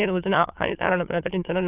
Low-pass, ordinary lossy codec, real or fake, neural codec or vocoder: 3.6 kHz; Opus, 24 kbps; fake; autoencoder, 22.05 kHz, a latent of 192 numbers a frame, VITS, trained on many speakers